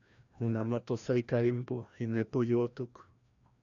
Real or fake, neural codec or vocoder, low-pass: fake; codec, 16 kHz, 1 kbps, FreqCodec, larger model; 7.2 kHz